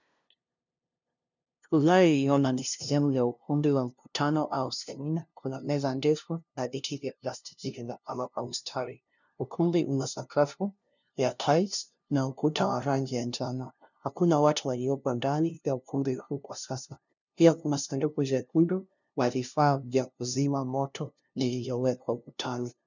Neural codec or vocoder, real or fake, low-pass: codec, 16 kHz, 0.5 kbps, FunCodec, trained on LibriTTS, 25 frames a second; fake; 7.2 kHz